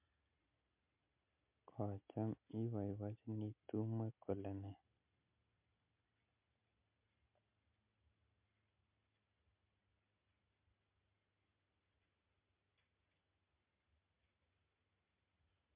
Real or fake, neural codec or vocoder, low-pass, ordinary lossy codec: real; none; 3.6 kHz; MP3, 32 kbps